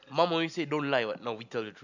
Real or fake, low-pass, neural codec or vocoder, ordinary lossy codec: real; 7.2 kHz; none; none